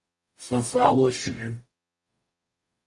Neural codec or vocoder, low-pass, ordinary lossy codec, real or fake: codec, 44.1 kHz, 0.9 kbps, DAC; 10.8 kHz; Opus, 64 kbps; fake